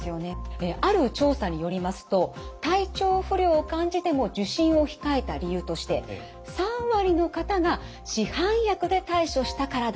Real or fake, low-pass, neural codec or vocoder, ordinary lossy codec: real; none; none; none